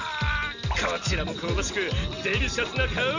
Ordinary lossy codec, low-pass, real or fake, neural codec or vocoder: none; 7.2 kHz; real; none